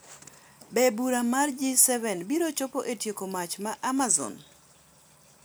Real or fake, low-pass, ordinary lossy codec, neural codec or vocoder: real; none; none; none